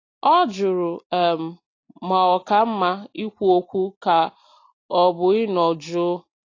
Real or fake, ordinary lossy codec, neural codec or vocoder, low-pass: real; AAC, 32 kbps; none; 7.2 kHz